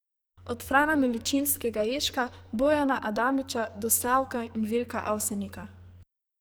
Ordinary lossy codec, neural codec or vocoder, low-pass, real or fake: none; codec, 44.1 kHz, 2.6 kbps, SNAC; none; fake